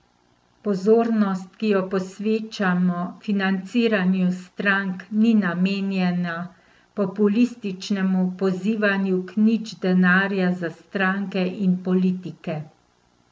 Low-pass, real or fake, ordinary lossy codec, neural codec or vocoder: none; real; none; none